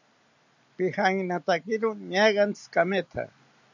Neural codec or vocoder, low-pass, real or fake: none; 7.2 kHz; real